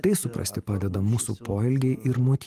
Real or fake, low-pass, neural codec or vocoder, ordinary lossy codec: fake; 14.4 kHz; vocoder, 44.1 kHz, 128 mel bands every 512 samples, BigVGAN v2; Opus, 24 kbps